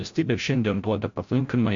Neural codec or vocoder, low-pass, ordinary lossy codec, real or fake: codec, 16 kHz, 0.5 kbps, FreqCodec, larger model; 7.2 kHz; MP3, 48 kbps; fake